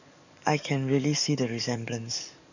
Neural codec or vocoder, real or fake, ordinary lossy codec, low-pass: codec, 44.1 kHz, 7.8 kbps, DAC; fake; none; 7.2 kHz